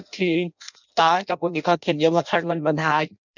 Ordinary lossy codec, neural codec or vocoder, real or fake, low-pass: none; codec, 16 kHz in and 24 kHz out, 0.6 kbps, FireRedTTS-2 codec; fake; 7.2 kHz